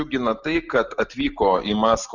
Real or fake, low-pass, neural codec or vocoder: real; 7.2 kHz; none